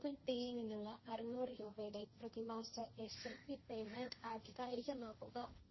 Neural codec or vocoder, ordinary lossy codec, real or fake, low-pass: codec, 16 kHz, 1.1 kbps, Voila-Tokenizer; MP3, 24 kbps; fake; 7.2 kHz